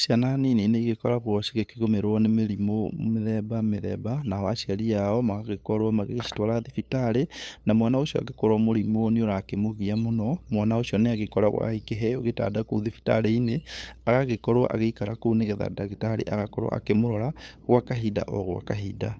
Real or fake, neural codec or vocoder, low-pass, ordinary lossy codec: fake; codec, 16 kHz, 8 kbps, FunCodec, trained on LibriTTS, 25 frames a second; none; none